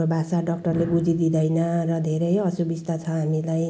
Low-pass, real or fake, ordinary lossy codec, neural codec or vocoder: none; real; none; none